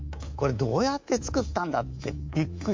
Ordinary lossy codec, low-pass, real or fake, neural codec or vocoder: MP3, 48 kbps; 7.2 kHz; fake; codec, 24 kHz, 3.1 kbps, DualCodec